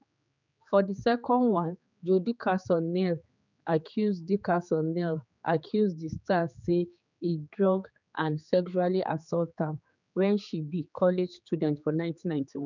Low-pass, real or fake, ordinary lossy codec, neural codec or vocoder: 7.2 kHz; fake; none; codec, 16 kHz, 4 kbps, X-Codec, HuBERT features, trained on general audio